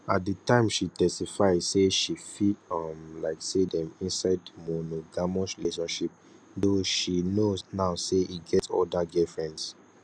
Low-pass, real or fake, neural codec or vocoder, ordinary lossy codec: none; real; none; none